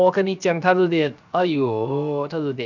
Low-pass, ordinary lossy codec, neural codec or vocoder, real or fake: 7.2 kHz; none; codec, 16 kHz, 0.7 kbps, FocalCodec; fake